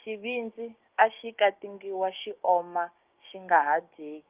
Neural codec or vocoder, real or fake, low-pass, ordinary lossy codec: codec, 16 kHz, 6 kbps, DAC; fake; 3.6 kHz; Opus, 24 kbps